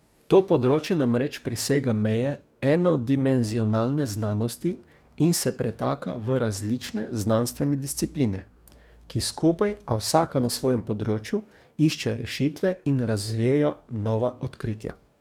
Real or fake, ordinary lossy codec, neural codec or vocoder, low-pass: fake; none; codec, 44.1 kHz, 2.6 kbps, DAC; 19.8 kHz